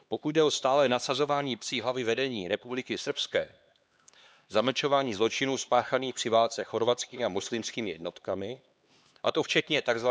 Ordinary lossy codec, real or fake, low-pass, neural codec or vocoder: none; fake; none; codec, 16 kHz, 2 kbps, X-Codec, HuBERT features, trained on LibriSpeech